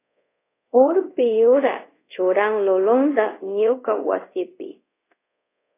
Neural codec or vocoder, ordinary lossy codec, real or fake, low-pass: codec, 24 kHz, 0.5 kbps, DualCodec; AAC, 24 kbps; fake; 3.6 kHz